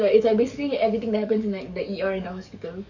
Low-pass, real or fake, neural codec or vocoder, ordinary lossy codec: 7.2 kHz; fake; codec, 44.1 kHz, 7.8 kbps, DAC; none